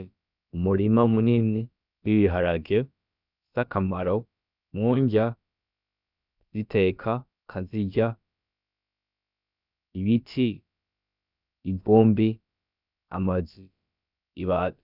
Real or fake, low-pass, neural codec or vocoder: fake; 5.4 kHz; codec, 16 kHz, about 1 kbps, DyCAST, with the encoder's durations